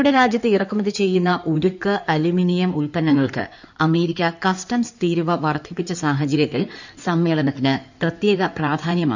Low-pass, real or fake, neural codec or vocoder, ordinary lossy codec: 7.2 kHz; fake; codec, 16 kHz in and 24 kHz out, 2.2 kbps, FireRedTTS-2 codec; none